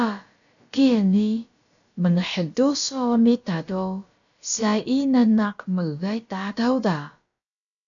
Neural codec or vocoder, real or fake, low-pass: codec, 16 kHz, about 1 kbps, DyCAST, with the encoder's durations; fake; 7.2 kHz